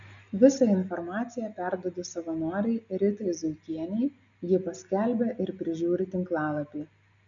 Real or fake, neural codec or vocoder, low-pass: real; none; 7.2 kHz